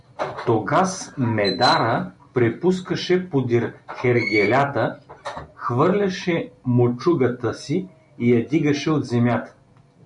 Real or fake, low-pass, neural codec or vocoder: real; 10.8 kHz; none